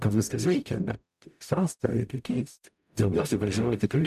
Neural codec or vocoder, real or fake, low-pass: codec, 44.1 kHz, 0.9 kbps, DAC; fake; 14.4 kHz